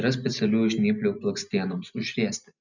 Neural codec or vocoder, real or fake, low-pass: none; real; 7.2 kHz